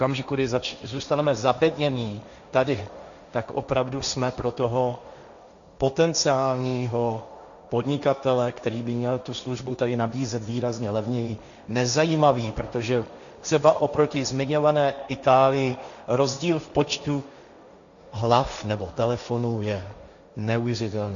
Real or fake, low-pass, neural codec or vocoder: fake; 7.2 kHz; codec, 16 kHz, 1.1 kbps, Voila-Tokenizer